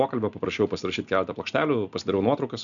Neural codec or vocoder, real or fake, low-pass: none; real; 7.2 kHz